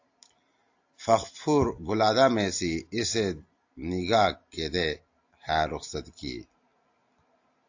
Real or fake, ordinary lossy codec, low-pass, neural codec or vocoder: real; AAC, 48 kbps; 7.2 kHz; none